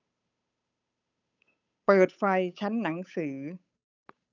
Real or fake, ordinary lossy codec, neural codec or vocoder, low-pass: fake; none; codec, 16 kHz, 8 kbps, FunCodec, trained on Chinese and English, 25 frames a second; 7.2 kHz